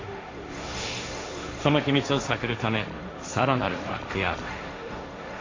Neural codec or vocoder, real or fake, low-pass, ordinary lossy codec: codec, 16 kHz, 1.1 kbps, Voila-Tokenizer; fake; 7.2 kHz; AAC, 32 kbps